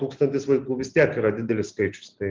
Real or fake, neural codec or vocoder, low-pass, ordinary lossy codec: real; none; 7.2 kHz; Opus, 32 kbps